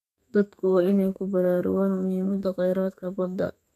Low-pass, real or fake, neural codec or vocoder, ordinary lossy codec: 14.4 kHz; fake; codec, 32 kHz, 1.9 kbps, SNAC; none